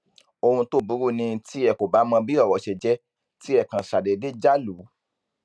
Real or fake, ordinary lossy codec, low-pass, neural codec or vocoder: real; none; none; none